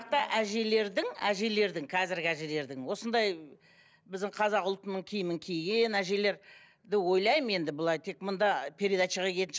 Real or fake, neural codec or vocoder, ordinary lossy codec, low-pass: real; none; none; none